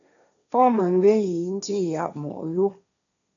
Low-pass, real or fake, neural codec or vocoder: 7.2 kHz; fake; codec, 16 kHz, 1.1 kbps, Voila-Tokenizer